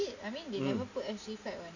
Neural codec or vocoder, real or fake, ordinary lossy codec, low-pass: none; real; none; 7.2 kHz